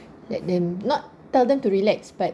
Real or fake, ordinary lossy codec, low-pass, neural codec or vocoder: real; none; none; none